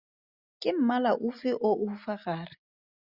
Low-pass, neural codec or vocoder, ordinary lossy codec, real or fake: 5.4 kHz; none; Opus, 64 kbps; real